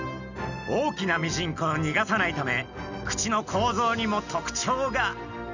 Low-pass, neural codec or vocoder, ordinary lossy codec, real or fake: 7.2 kHz; none; none; real